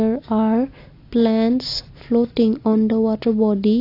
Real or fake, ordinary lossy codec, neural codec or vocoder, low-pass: real; AAC, 32 kbps; none; 5.4 kHz